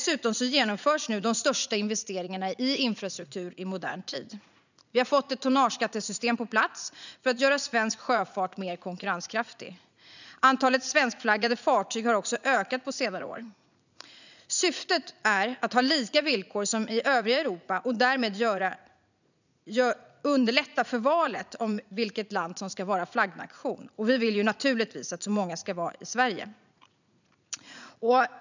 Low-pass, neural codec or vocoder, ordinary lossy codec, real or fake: 7.2 kHz; vocoder, 44.1 kHz, 80 mel bands, Vocos; none; fake